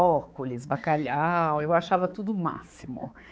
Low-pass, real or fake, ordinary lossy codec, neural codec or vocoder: none; fake; none; codec, 16 kHz, 4 kbps, X-Codec, HuBERT features, trained on balanced general audio